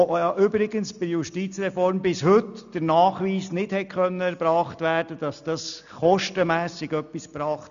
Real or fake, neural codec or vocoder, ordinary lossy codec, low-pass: real; none; none; 7.2 kHz